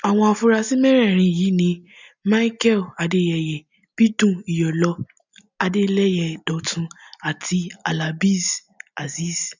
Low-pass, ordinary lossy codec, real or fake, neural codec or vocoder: 7.2 kHz; none; real; none